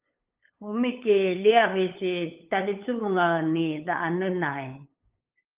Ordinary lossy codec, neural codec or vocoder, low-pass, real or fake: Opus, 32 kbps; codec, 16 kHz, 8 kbps, FunCodec, trained on LibriTTS, 25 frames a second; 3.6 kHz; fake